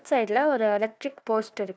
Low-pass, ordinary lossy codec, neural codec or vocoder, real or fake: none; none; codec, 16 kHz, 1 kbps, FunCodec, trained on Chinese and English, 50 frames a second; fake